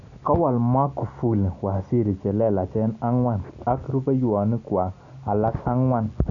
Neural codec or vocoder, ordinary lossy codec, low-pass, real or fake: none; none; 7.2 kHz; real